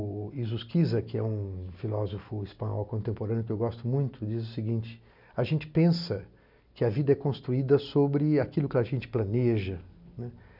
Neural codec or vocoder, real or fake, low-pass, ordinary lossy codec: none; real; 5.4 kHz; none